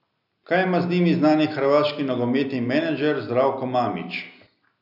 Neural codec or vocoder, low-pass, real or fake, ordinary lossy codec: none; 5.4 kHz; real; none